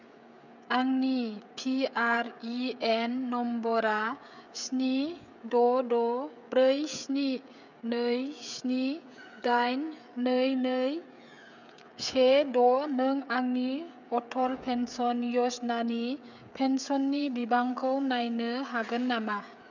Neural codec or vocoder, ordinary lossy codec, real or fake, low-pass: codec, 16 kHz, 16 kbps, FreqCodec, smaller model; none; fake; 7.2 kHz